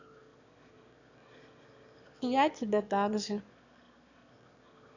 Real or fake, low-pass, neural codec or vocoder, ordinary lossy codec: fake; 7.2 kHz; autoencoder, 22.05 kHz, a latent of 192 numbers a frame, VITS, trained on one speaker; none